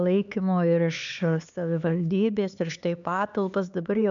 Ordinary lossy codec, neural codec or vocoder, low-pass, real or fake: MP3, 96 kbps; codec, 16 kHz, 4 kbps, X-Codec, HuBERT features, trained on LibriSpeech; 7.2 kHz; fake